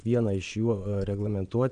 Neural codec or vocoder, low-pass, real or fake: vocoder, 22.05 kHz, 80 mel bands, Vocos; 9.9 kHz; fake